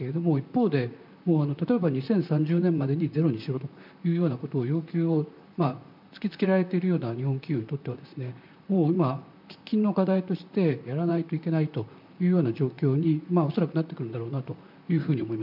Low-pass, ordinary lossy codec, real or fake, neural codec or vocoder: 5.4 kHz; MP3, 48 kbps; fake; vocoder, 44.1 kHz, 128 mel bands, Pupu-Vocoder